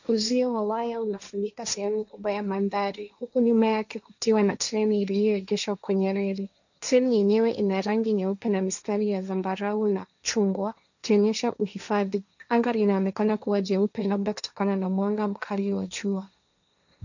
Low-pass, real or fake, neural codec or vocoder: 7.2 kHz; fake; codec, 16 kHz, 1.1 kbps, Voila-Tokenizer